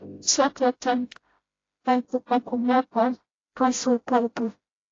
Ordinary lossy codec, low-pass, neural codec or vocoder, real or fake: AAC, 32 kbps; 7.2 kHz; codec, 16 kHz, 0.5 kbps, FreqCodec, smaller model; fake